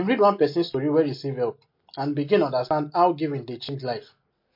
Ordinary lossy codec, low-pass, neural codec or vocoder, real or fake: MP3, 32 kbps; 5.4 kHz; none; real